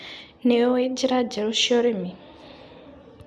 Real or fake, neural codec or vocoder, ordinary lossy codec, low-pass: real; none; none; none